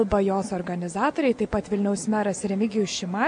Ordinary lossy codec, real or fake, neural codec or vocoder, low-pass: MP3, 48 kbps; real; none; 9.9 kHz